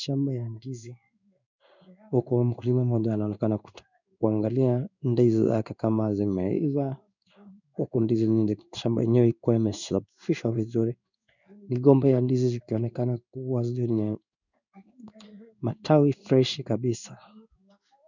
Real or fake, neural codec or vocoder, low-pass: fake; codec, 16 kHz in and 24 kHz out, 1 kbps, XY-Tokenizer; 7.2 kHz